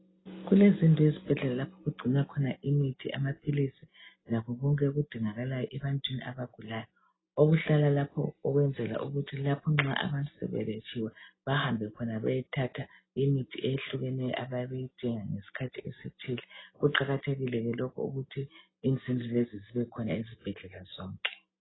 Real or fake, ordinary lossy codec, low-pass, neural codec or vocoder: real; AAC, 16 kbps; 7.2 kHz; none